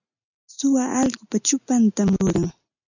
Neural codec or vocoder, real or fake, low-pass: none; real; 7.2 kHz